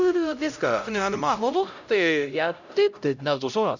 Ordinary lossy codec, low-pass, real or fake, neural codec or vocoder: none; 7.2 kHz; fake; codec, 16 kHz, 0.5 kbps, X-Codec, HuBERT features, trained on LibriSpeech